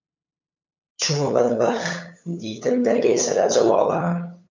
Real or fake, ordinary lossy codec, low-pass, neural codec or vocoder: fake; MP3, 64 kbps; 7.2 kHz; codec, 16 kHz, 8 kbps, FunCodec, trained on LibriTTS, 25 frames a second